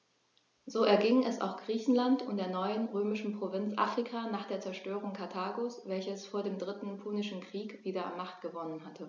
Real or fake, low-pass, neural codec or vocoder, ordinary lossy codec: real; 7.2 kHz; none; none